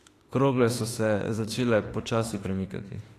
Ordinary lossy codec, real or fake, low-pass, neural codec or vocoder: AAC, 48 kbps; fake; 14.4 kHz; autoencoder, 48 kHz, 32 numbers a frame, DAC-VAE, trained on Japanese speech